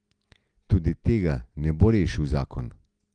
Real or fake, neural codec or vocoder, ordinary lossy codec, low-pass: real; none; Opus, 24 kbps; 9.9 kHz